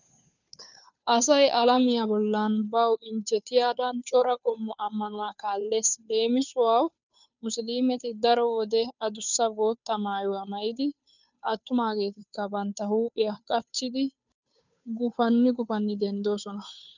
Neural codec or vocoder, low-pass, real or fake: codec, 16 kHz, 2 kbps, FunCodec, trained on Chinese and English, 25 frames a second; 7.2 kHz; fake